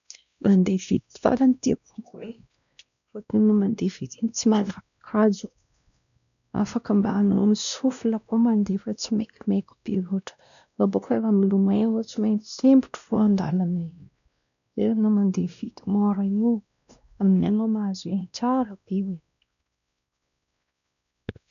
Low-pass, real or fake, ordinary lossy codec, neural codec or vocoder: 7.2 kHz; fake; none; codec, 16 kHz, 1 kbps, X-Codec, WavLM features, trained on Multilingual LibriSpeech